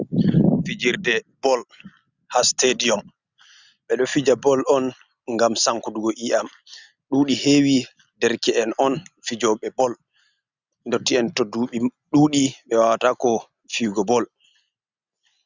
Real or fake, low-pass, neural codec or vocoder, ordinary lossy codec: real; 7.2 kHz; none; Opus, 64 kbps